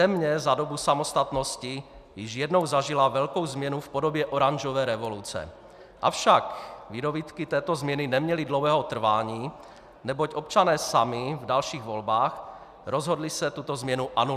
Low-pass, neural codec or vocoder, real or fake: 14.4 kHz; none; real